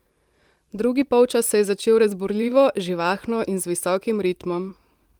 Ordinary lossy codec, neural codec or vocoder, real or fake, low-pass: Opus, 32 kbps; vocoder, 44.1 kHz, 128 mel bands every 512 samples, BigVGAN v2; fake; 19.8 kHz